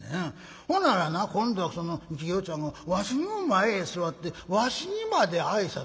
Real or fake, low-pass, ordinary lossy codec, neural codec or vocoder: real; none; none; none